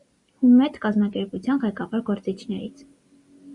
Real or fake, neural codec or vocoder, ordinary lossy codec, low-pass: real; none; Opus, 64 kbps; 10.8 kHz